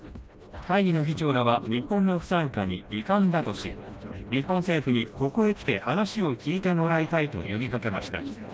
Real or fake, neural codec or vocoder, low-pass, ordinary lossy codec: fake; codec, 16 kHz, 1 kbps, FreqCodec, smaller model; none; none